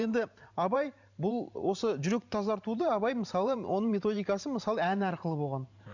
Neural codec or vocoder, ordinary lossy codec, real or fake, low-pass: vocoder, 44.1 kHz, 128 mel bands every 512 samples, BigVGAN v2; none; fake; 7.2 kHz